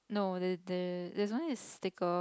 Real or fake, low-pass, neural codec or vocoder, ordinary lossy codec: real; none; none; none